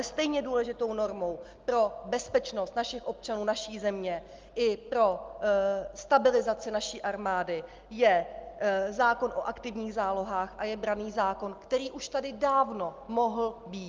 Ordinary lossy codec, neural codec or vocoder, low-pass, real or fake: Opus, 32 kbps; none; 7.2 kHz; real